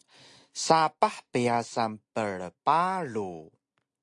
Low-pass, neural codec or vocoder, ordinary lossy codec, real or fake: 10.8 kHz; none; AAC, 64 kbps; real